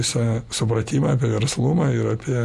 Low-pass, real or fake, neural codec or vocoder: 14.4 kHz; real; none